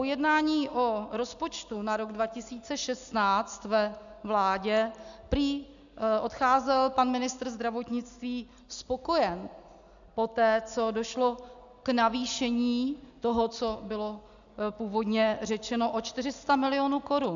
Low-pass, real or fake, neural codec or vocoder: 7.2 kHz; real; none